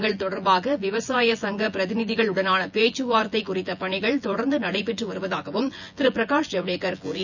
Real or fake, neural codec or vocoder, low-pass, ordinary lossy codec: fake; vocoder, 24 kHz, 100 mel bands, Vocos; 7.2 kHz; none